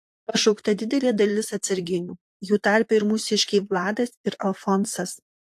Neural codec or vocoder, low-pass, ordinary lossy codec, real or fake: vocoder, 44.1 kHz, 128 mel bands, Pupu-Vocoder; 14.4 kHz; AAC, 64 kbps; fake